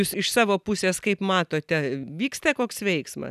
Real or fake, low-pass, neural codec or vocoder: real; 14.4 kHz; none